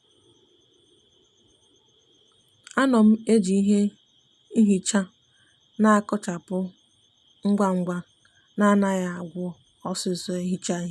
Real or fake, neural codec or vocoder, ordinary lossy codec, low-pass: real; none; none; none